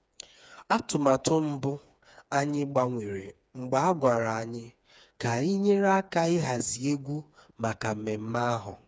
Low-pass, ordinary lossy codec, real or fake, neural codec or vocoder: none; none; fake; codec, 16 kHz, 4 kbps, FreqCodec, smaller model